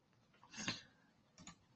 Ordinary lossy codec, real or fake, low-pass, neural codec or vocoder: Opus, 24 kbps; real; 7.2 kHz; none